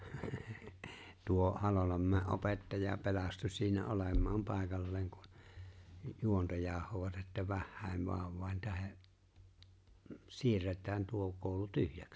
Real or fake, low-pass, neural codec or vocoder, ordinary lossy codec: real; none; none; none